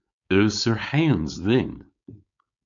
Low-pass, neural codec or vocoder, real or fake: 7.2 kHz; codec, 16 kHz, 4.8 kbps, FACodec; fake